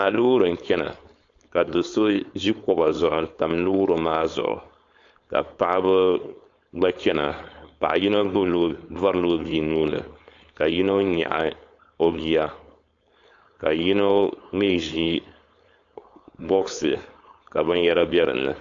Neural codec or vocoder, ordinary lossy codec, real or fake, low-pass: codec, 16 kHz, 4.8 kbps, FACodec; AAC, 48 kbps; fake; 7.2 kHz